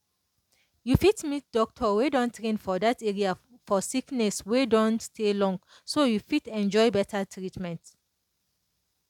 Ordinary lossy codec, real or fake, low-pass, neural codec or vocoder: none; real; 19.8 kHz; none